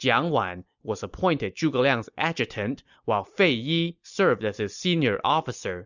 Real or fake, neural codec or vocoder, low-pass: real; none; 7.2 kHz